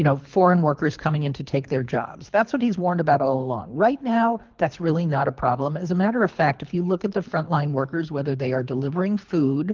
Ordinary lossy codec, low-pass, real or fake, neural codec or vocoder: Opus, 32 kbps; 7.2 kHz; fake; codec, 24 kHz, 3 kbps, HILCodec